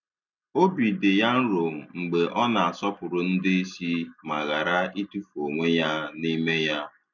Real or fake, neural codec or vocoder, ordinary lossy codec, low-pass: real; none; none; 7.2 kHz